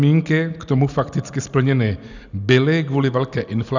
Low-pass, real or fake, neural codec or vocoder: 7.2 kHz; real; none